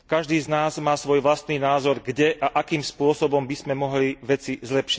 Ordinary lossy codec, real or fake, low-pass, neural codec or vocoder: none; real; none; none